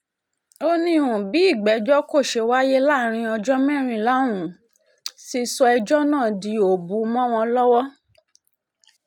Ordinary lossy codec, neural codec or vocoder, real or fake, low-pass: none; none; real; 19.8 kHz